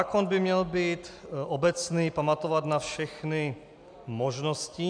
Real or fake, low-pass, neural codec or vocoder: real; 9.9 kHz; none